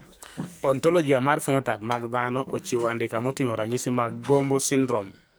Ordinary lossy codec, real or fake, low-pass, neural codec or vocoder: none; fake; none; codec, 44.1 kHz, 2.6 kbps, SNAC